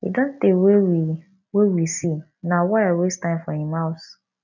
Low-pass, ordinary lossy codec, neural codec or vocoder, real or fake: 7.2 kHz; none; none; real